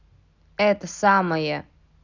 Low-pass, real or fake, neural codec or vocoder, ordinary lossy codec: 7.2 kHz; real; none; none